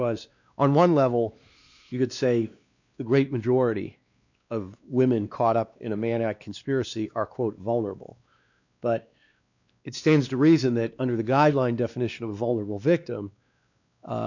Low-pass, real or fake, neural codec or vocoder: 7.2 kHz; fake; codec, 16 kHz, 2 kbps, X-Codec, WavLM features, trained on Multilingual LibriSpeech